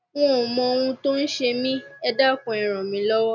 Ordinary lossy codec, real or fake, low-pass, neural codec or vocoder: none; real; 7.2 kHz; none